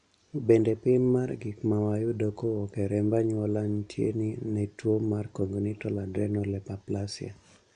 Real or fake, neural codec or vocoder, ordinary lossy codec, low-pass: real; none; none; 9.9 kHz